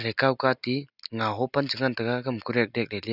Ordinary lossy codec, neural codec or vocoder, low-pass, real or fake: none; none; 5.4 kHz; real